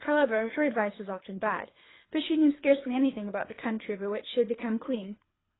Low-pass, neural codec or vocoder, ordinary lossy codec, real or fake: 7.2 kHz; codec, 24 kHz, 3 kbps, HILCodec; AAC, 16 kbps; fake